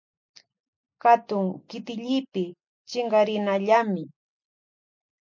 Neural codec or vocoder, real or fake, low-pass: none; real; 7.2 kHz